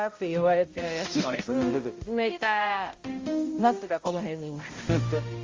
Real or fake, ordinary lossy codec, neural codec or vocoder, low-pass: fake; Opus, 32 kbps; codec, 16 kHz, 0.5 kbps, X-Codec, HuBERT features, trained on balanced general audio; 7.2 kHz